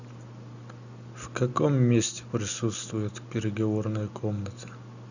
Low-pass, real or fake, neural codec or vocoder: 7.2 kHz; real; none